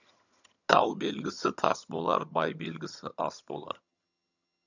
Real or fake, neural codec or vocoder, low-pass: fake; vocoder, 22.05 kHz, 80 mel bands, HiFi-GAN; 7.2 kHz